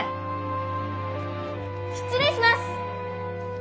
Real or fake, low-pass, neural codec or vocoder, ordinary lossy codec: real; none; none; none